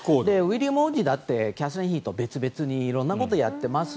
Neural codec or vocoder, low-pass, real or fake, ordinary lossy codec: none; none; real; none